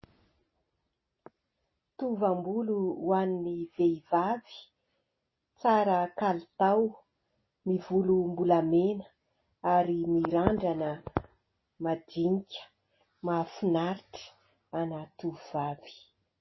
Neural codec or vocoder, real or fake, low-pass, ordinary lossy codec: none; real; 7.2 kHz; MP3, 24 kbps